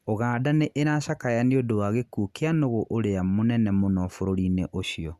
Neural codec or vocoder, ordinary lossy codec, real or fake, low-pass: none; Opus, 64 kbps; real; 14.4 kHz